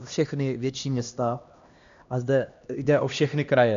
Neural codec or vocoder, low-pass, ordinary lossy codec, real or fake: codec, 16 kHz, 1 kbps, X-Codec, HuBERT features, trained on LibriSpeech; 7.2 kHz; MP3, 64 kbps; fake